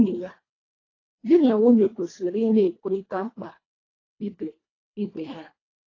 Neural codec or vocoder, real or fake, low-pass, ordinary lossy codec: codec, 24 kHz, 1.5 kbps, HILCodec; fake; 7.2 kHz; AAC, 32 kbps